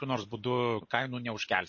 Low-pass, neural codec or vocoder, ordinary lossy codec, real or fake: 7.2 kHz; none; MP3, 32 kbps; real